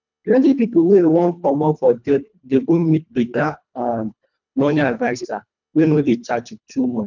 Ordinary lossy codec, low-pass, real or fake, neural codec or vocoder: none; 7.2 kHz; fake; codec, 24 kHz, 1.5 kbps, HILCodec